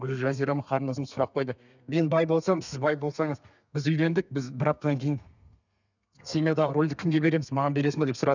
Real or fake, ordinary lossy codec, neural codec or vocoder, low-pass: fake; none; codec, 32 kHz, 1.9 kbps, SNAC; 7.2 kHz